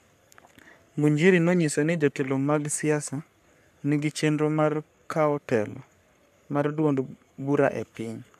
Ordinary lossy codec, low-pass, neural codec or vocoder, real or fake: none; 14.4 kHz; codec, 44.1 kHz, 3.4 kbps, Pupu-Codec; fake